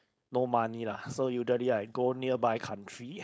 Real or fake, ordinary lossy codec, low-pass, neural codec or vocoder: fake; none; none; codec, 16 kHz, 4.8 kbps, FACodec